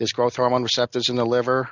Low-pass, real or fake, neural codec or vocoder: 7.2 kHz; real; none